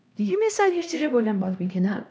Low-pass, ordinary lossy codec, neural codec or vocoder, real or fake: none; none; codec, 16 kHz, 1 kbps, X-Codec, HuBERT features, trained on LibriSpeech; fake